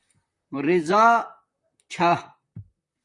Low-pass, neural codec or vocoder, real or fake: 10.8 kHz; vocoder, 44.1 kHz, 128 mel bands, Pupu-Vocoder; fake